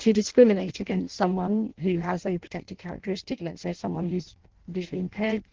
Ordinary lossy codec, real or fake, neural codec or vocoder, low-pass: Opus, 16 kbps; fake; codec, 16 kHz in and 24 kHz out, 0.6 kbps, FireRedTTS-2 codec; 7.2 kHz